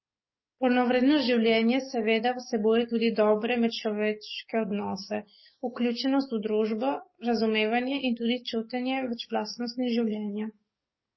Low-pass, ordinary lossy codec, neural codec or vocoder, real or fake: 7.2 kHz; MP3, 24 kbps; codec, 44.1 kHz, 7.8 kbps, DAC; fake